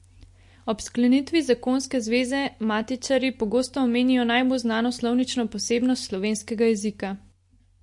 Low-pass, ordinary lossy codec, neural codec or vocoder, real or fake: 10.8 kHz; MP3, 48 kbps; none; real